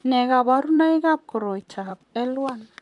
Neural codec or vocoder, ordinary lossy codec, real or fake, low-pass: vocoder, 44.1 kHz, 128 mel bands, Pupu-Vocoder; none; fake; 10.8 kHz